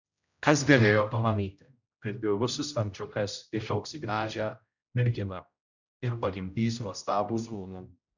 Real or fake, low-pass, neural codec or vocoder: fake; 7.2 kHz; codec, 16 kHz, 0.5 kbps, X-Codec, HuBERT features, trained on general audio